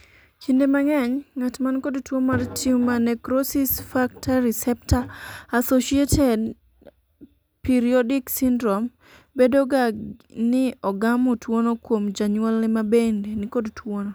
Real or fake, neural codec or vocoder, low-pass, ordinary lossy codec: real; none; none; none